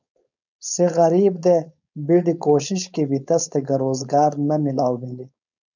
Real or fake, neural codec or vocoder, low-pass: fake; codec, 16 kHz, 4.8 kbps, FACodec; 7.2 kHz